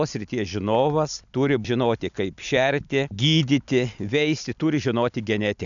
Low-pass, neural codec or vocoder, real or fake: 7.2 kHz; none; real